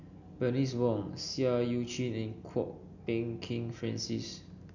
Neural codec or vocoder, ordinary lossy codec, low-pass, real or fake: none; none; 7.2 kHz; real